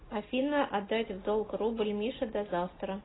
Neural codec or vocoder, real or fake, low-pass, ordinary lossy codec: none; real; 7.2 kHz; AAC, 16 kbps